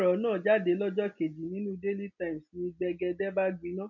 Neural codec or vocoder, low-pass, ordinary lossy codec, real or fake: none; 7.2 kHz; none; real